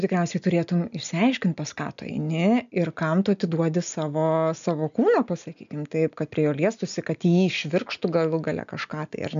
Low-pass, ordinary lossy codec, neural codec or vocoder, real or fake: 7.2 kHz; AAC, 96 kbps; none; real